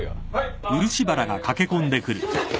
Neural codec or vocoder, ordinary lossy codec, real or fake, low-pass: none; none; real; none